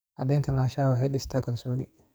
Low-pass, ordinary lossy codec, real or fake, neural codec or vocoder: none; none; fake; codec, 44.1 kHz, 2.6 kbps, SNAC